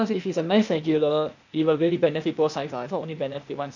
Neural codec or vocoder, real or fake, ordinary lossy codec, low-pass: codec, 16 kHz, 0.8 kbps, ZipCodec; fake; none; 7.2 kHz